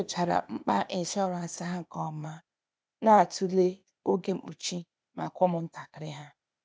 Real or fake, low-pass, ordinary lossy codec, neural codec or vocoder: fake; none; none; codec, 16 kHz, 0.8 kbps, ZipCodec